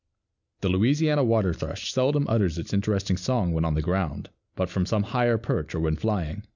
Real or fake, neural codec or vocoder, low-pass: real; none; 7.2 kHz